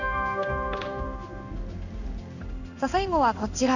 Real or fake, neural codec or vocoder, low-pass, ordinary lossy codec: fake; codec, 16 kHz in and 24 kHz out, 1 kbps, XY-Tokenizer; 7.2 kHz; none